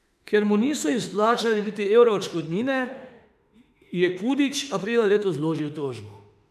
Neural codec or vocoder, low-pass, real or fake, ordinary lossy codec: autoencoder, 48 kHz, 32 numbers a frame, DAC-VAE, trained on Japanese speech; 14.4 kHz; fake; none